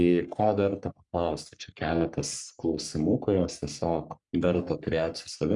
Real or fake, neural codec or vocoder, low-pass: fake; codec, 44.1 kHz, 3.4 kbps, Pupu-Codec; 10.8 kHz